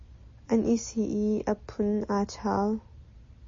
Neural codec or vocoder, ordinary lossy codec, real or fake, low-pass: none; MP3, 32 kbps; real; 7.2 kHz